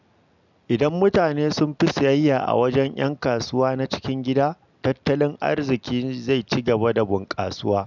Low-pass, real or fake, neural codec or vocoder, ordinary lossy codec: 7.2 kHz; real; none; none